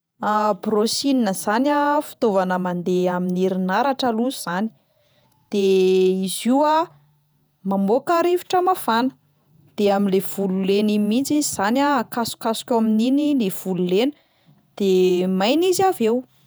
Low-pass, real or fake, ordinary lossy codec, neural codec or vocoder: none; fake; none; vocoder, 48 kHz, 128 mel bands, Vocos